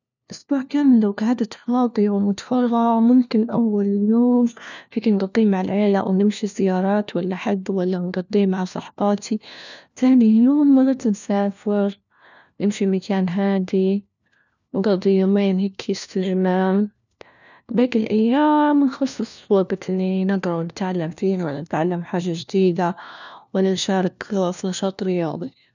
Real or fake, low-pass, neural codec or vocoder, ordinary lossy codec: fake; 7.2 kHz; codec, 16 kHz, 1 kbps, FunCodec, trained on LibriTTS, 50 frames a second; none